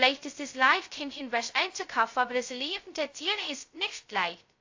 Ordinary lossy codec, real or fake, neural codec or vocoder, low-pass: AAC, 48 kbps; fake; codec, 16 kHz, 0.2 kbps, FocalCodec; 7.2 kHz